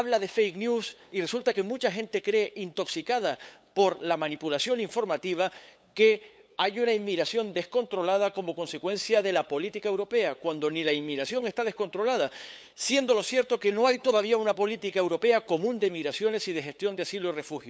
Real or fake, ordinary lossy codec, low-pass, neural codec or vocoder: fake; none; none; codec, 16 kHz, 8 kbps, FunCodec, trained on LibriTTS, 25 frames a second